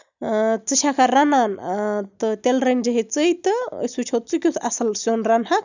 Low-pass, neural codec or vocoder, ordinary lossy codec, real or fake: 7.2 kHz; none; none; real